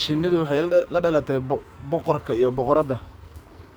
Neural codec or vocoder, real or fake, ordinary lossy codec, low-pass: codec, 44.1 kHz, 2.6 kbps, SNAC; fake; none; none